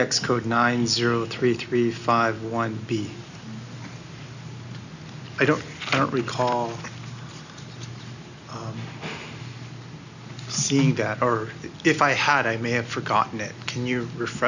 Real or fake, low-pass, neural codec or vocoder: real; 7.2 kHz; none